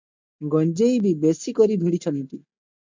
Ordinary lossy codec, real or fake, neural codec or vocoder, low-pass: MP3, 64 kbps; real; none; 7.2 kHz